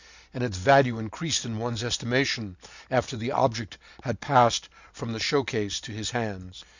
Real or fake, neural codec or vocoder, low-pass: real; none; 7.2 kHz